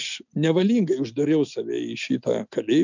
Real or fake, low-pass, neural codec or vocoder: real; 7.2 kHz; none